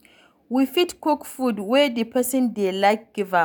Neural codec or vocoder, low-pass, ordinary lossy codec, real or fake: none; none; none; real